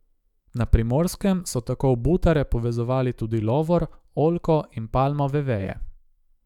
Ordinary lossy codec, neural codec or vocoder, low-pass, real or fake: none; autoencoder, 48 kHz, 128 numbers a frame, DAC-VAE, trained on Japanese speech; 19.8 kHz; fake